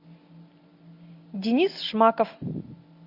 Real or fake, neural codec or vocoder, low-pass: real; none; 5.4 kHz